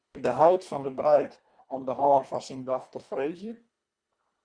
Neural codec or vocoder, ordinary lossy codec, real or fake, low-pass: codec, 24 kHz, 1.5 kbps, HILCodec; Opus, 64 kbps; fake; 9.9 kHz